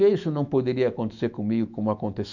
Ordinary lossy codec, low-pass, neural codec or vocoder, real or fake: none; 7.2 kHz; autoencoder, 48 kHz, 128 numbers a frame, DAC-VAE, trained on Japanese speech; fake